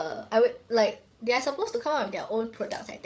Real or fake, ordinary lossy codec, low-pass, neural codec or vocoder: fake; none; none; codec, 16 kHz, 8 kbps, FreqCodec, larger model